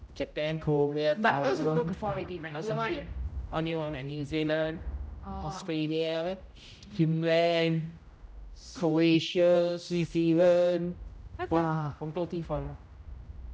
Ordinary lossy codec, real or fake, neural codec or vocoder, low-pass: none; fake; codec, 16 kHz, 0.5 kbps, X-Codec, HuBERT features, trained on general audio; none